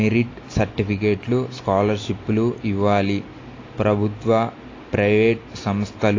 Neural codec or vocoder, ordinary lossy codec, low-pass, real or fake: none; AAC, 32 kbps; 7.2 kHz; real